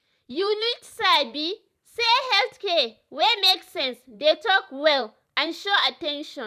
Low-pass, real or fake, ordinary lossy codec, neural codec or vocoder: 14.4 kHz; fake; none; vocoder, 44.1 kHz, 128 mel bands every 512 samples, BigVGAN v2